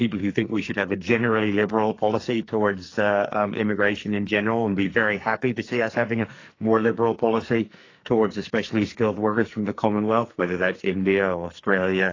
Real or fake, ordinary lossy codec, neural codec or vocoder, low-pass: fake; AAC, 32 kbps; codec, 44.1 kHz, 2.6 kbps, SNAC; 7.2 kHz